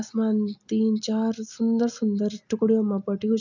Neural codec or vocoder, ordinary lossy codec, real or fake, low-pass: none; none; real; 7.2 kHz